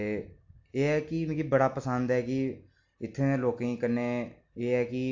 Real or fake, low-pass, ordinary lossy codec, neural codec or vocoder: real; 7.2 kHz; AAC, 48 kbps; none